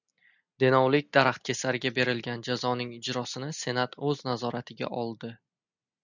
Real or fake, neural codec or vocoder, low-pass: real; none; 7.2 kHz